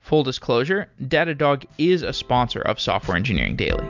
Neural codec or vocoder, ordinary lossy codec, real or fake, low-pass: none; MP3, 64 kbps; real; 7.2 kHz